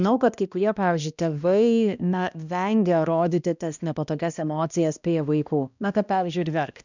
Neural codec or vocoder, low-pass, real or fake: codec, 16 kHz, 1 kbps, X-Codec, HuBERT features, trained on balanced general audio; 7.2 kHz; fake